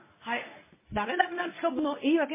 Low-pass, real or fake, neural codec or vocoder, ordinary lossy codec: 3.6 kHz; fake; codec, 24 kHz, 0.9 kbps, WavTokenizer, medium speech release version 1; MP3, 16 kbps